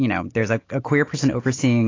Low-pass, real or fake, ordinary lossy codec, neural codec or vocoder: 7.2 kHz; real; AAC, 32 kbps; none